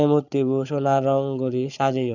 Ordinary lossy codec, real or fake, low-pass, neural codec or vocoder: none; fake; 7.2 kHz; codec, 16 kHz, 8 kbps, FreqCodec, larger model